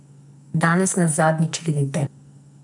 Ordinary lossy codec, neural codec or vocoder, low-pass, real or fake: none; codec, 44.1 kHz, 2.6 kbps, SNAC; 10.8 kHz; fake